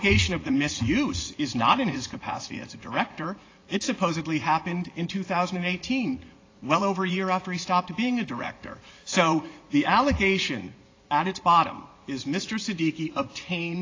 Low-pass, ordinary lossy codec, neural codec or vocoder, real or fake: 7.2 kHz; AAC, 32 kbps; vocoder, 44.1 kHz, 80 mel bands, Vocos; fake